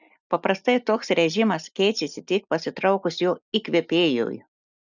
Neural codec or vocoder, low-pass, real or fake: none; 7.2 kHz; real